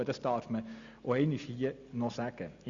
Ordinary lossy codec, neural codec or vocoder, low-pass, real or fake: Opus, 64 kbps; none; 7.2 kHz; real